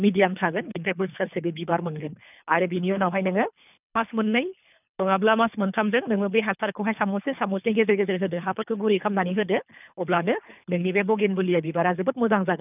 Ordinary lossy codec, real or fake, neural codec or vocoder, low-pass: none; fake; codec, 24 kHz, 3 kbps, HILCodec; 3.6 kHz